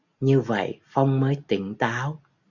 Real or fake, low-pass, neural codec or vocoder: real; 7.2 kHz; none